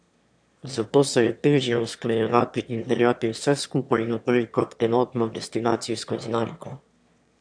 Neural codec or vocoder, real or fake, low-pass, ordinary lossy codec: autoencoder, 22.05 kHz, a latent of 192 numbers a frame, VITS, trained on one speaker; fake; 9.9 kHz; MP3, 96 kbps